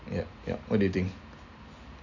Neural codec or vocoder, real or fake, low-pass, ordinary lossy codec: none; real; 7.2 kHz; none